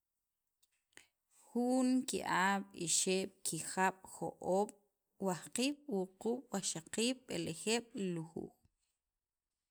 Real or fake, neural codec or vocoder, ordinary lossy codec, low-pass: real; none; none; none